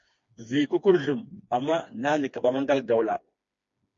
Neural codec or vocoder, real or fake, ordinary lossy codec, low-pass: codec, 16 kHz, 2 kbps, FreqCodec, smaller model; fake; MP3, 48 kbps; 7.2 kHz